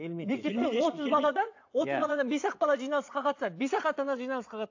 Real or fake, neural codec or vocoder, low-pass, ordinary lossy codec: fake; vocoder, 22.05 kHz, 80 mel bands, Vocos; 7.2 kHz; AAC, 48 kbps